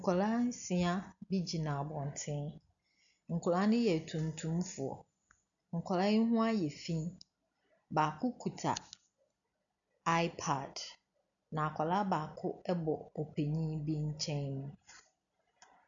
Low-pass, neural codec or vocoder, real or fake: 7.2 kHz; none; real